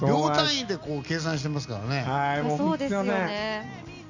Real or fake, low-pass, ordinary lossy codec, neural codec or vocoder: real; 7.2 kHz; none; none